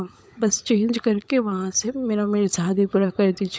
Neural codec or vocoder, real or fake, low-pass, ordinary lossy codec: codec, 16 kHz, 16 kbps, FunCodec, trained on LibriTTS, 50 frames a second; fake; none; none